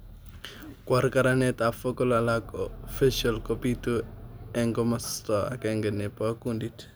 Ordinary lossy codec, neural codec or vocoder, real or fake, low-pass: none; none; real; none